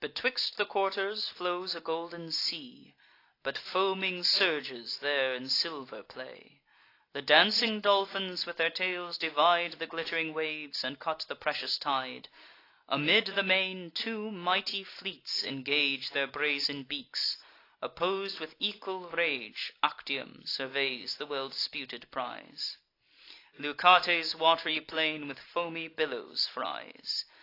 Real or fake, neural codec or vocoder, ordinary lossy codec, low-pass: real; none; AAC, 32 kbps; 5.4 kHz